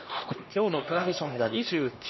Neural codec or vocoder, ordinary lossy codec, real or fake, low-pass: codec, 16 kHz, 1 kbps, X-Codec, HuBERT features, trained on LibriSpeech; MP3, 24 kbps; fake; 7.2 kHz